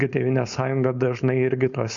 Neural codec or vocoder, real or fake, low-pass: codec, 16 kHz, 4.8 kbps, FACodec; fake; 7.2 kHz